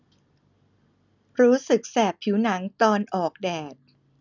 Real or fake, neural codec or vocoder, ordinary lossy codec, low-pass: real; none; none; 7.2 kHz